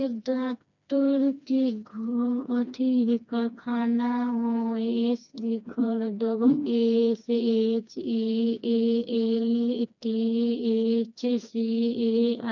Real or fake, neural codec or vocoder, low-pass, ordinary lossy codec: fake; codec, 16 kHz, 2 kbps, FreqCodec, smaller model; 7.2 kHz; none